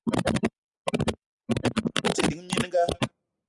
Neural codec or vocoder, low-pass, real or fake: none; 10.8 kHz; real